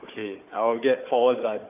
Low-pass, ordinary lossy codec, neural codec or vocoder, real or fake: 3.6 kHz; AAC, 32 kbps; codec, 16 kHz, 4 kbps, FunCodec, trained on Chinese and English, 50 frames a second; fake